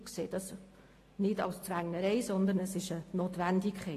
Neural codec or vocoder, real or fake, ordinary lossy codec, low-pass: none; real; AAC, 48 kbps; 14.4 kHz